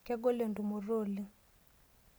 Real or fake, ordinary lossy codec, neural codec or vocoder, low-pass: real; none; none; none